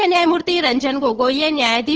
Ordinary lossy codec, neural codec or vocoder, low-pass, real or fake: Opus, 16 kbps; codec, 16 kHz, 8 kbps, FunCodec, trained on LibriTTS, 25 frames a second; 7.2 kHz; fake